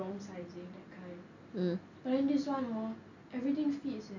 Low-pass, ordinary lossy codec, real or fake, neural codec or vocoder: 7.2 kHz; none; real; none